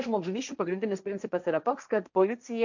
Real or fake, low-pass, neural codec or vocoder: fake; 7.2 kHz; codec, 16 kHz, 1.1 kbps, Voila-Tokenizer